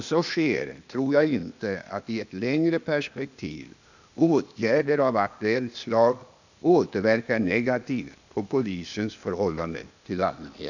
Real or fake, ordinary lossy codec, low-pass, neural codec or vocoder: fake; none; 7.2 kHz; codec, 16 kHz, 0.8 kbps, ZipCodec